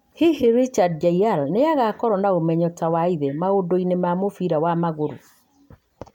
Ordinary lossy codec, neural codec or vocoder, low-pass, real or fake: MP3, 96 kbps; none; 19.8 kHz; real